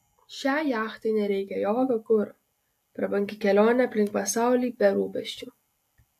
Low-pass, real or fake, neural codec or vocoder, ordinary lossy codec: 14.4 kHz; real; none; AAC, 64 kbps